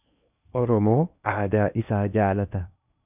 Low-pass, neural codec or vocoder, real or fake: 3.6 kHz; codec, 16 kHz in and 24 kHz out, 0.8 kbps, FocalCodec, streaming, 65536 codes; fake